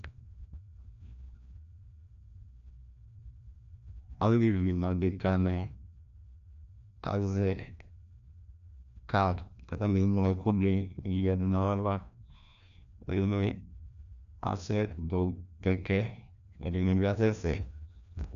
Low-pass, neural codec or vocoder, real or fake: 7.2 kHz; codec, 16 kHz, 1 kbps, FreqCodec, larger model; fake